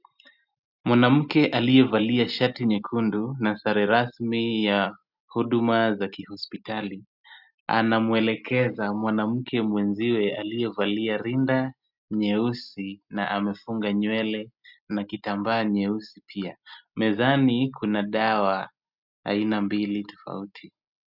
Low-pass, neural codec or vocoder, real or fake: 5.4 kHz; none; real